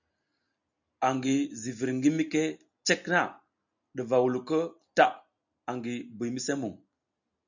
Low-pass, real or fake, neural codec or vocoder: 7.2 kHz; real; none